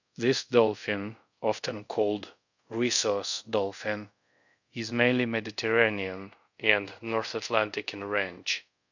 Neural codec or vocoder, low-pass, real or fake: codec, 24 kHz, 0.5 kbps, DualCodec; 7.2 kHz; fake